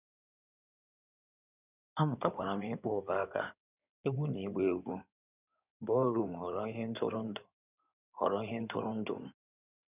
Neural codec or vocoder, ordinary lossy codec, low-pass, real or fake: codec, 16 kHz in and 24 kHz out, 2.2 kbps, FireRedTTS-2 codec; none; 3.6 kHz; fake